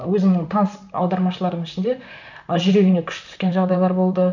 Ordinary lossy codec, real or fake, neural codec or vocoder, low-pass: none; fake; vocoder, 44.1 kHz, 128 mel bands, Pupu-Vocoder; 7.2 kHz